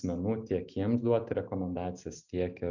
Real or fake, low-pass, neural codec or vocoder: real; 7.2 kHz; none